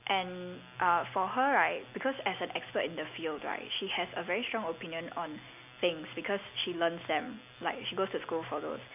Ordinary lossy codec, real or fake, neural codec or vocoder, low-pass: none; real; none; 3.6 kHz